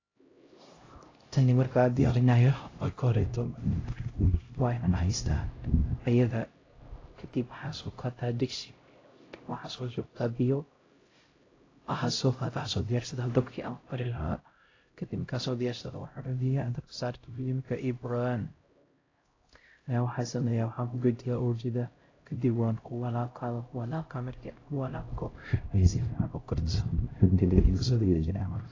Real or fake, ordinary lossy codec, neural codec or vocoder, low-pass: fake; AAC, 32 kbps; codec, 16 kHz, 0.5 kbps, X-Codec, HuBERT features, trained on LibriSpeech; 7.2 kHz